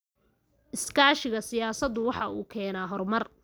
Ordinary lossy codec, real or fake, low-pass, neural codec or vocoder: none; real; none; none